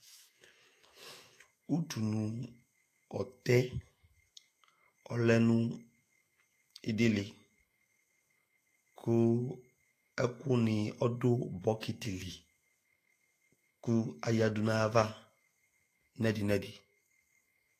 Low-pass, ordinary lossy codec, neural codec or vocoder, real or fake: 14.4 kHz; AAC, 48 kbps; autoencoder, 48 kHz, 128 numbers a frame, DAC-VAE, trained on Japanese speech; fake